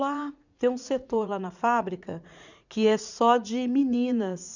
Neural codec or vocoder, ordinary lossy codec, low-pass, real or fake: vocoder, 44.1 kHz, 80 mel bands, Vocos; none; 7.2 kHz; fake